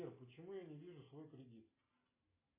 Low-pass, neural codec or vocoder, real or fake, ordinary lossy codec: 3.6 kHz; none; real; AAC, 24 kbps